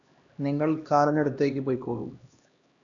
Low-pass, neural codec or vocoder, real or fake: 7.2 kHz; codec, 16 kHz, 1 kbps, X-Codec, HuBERT features, trained on LibriSpeech; fake